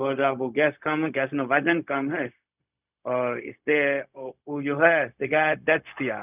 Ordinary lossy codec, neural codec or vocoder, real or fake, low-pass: none; codec, 16 kHz, 0.4 kbps, LongCat-Audio-Codec; fake; 3.6 kHz